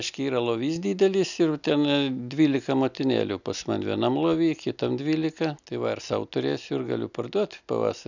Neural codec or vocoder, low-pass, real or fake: none; 7.2 kHz; real